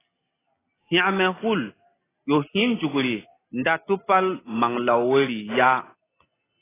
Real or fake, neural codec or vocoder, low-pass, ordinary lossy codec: real; none; 3.6 kHz; AAC, 16 kbps